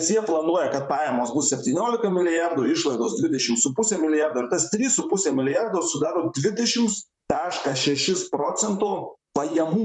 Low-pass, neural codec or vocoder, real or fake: 10.8 kHz; vocoder, 44.1 kHz, 128 mel bands, Pupu-Vocoder; fake